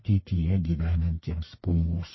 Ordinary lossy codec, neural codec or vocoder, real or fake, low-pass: MP3, 24 kbps; codec, 44.1 kHz, 1.7 kbps, Pupu-Codec; fake; 7.2 kHz